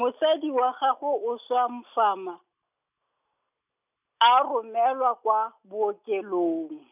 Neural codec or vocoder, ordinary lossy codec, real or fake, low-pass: none; none; real; 3.6 kHz